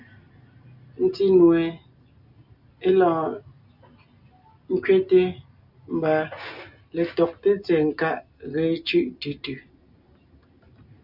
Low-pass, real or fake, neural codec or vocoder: 5.4 kHz; real; none